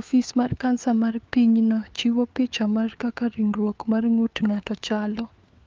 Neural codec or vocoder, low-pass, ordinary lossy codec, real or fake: codec, 16 kHz, 4 kbps, FunCodec, trained on LibriTTS, 50 frames a second; 7.2 kHz; Opus, 24 kbps; fake